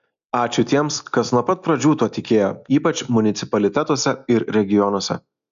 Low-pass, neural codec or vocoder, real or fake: 7.2 kHz; none; real